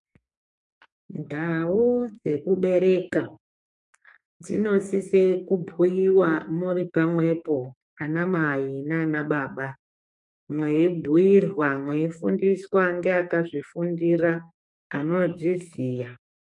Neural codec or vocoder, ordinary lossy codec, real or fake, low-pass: codec, 44.1 kHz, 2.6 kbps, SNAC; MP3, 64 kbps; fake; 10.8 kHz